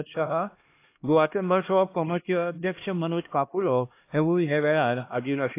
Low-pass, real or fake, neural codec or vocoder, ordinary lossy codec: 3.6 kHz; fake; codec, 16 kHz, 1 kbps, X-Codec, HuBERT features, trained on LibriSpeech; AAC, 24 kbps